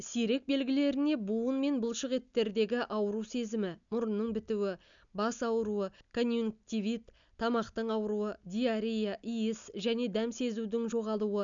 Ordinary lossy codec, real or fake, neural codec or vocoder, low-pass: none; real; none; 7.2 kHz